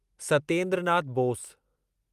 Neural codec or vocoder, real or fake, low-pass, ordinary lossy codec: none; real; 14.4 kHz; Opus, 32 kbps